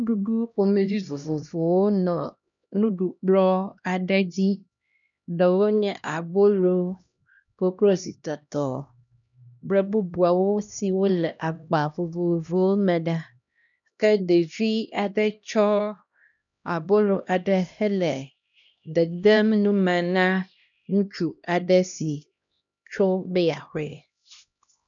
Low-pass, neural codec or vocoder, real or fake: 7.2 kHz; codec, 16 kHz, 1 kbps, X-Codec, HuBERT features, trained on LibriSpeech; fake